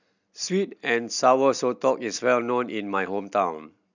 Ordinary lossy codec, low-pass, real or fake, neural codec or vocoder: none; 7.2 kHz; real; none